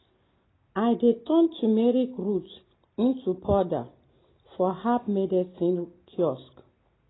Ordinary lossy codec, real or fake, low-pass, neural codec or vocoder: AAC, 16 kbps; real; 7.2 kHz; none